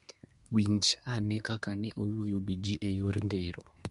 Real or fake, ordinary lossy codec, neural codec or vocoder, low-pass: fake; MP3, 64 kbps; codec, 24 kHz, 1 kbps, SNAC; 10.8 kHz